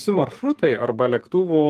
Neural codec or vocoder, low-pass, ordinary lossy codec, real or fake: codec, 44.1 kHz, 2.6 kbps, SNAC; 14.4 kHz; Opus, 32 kbps; fake